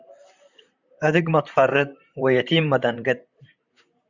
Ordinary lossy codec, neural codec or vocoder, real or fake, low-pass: Opus, 64 kbps; vocoder, 44.1 kHz, 128 mel bands, Pupu-Vocoder; fake; 7.2 kHz